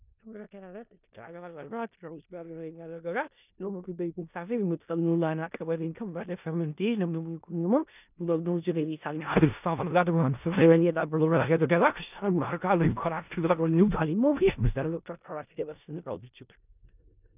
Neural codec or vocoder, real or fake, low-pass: codec, 16 kHz in and 24 kHz out, 0.4 kbps, LongCat-Audio-Codec, four codebook decoder; fake; 3.6 kHz